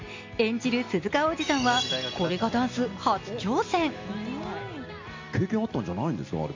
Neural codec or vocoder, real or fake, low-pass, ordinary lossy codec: none; real; 7.2 kHz; MP3, 48 kbps